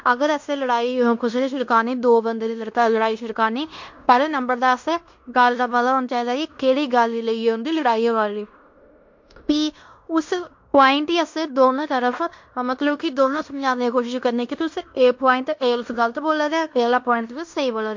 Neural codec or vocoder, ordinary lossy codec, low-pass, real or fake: codec, 16 kHz in and 24 kHz out, 0.9 kbps, LongCat-Audio-Codec, fine tuned four codebook decoder; MP3, 48 kbps; 7.2 kHz; fake